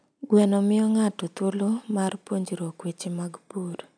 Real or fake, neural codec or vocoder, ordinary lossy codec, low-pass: real; none; none; 9.9 kHz